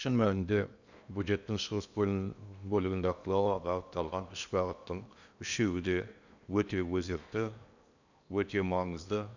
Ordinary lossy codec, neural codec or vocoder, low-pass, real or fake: none; codec, 16 kHz in and 24 kHz out, 0.8 kbps, FocalCodec, streaming, 65536 codes; 7.2 kHz; fake